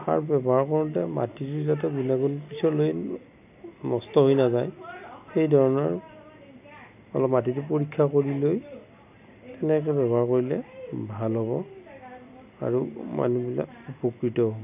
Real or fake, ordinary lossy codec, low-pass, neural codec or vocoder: real; none; 3.6 kHz; none